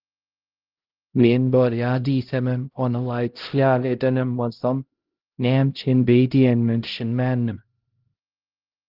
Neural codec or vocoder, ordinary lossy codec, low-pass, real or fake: codec, 16 kHz, 0.5 kbps, X-Codec, HuBERT features, trained on LibriSpeech; Opus, 16 kbps; 5.4 kHz; fake